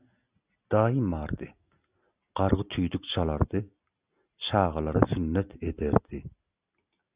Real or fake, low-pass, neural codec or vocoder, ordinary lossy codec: real; 3.6 kHz; none; Opus, 64 kbps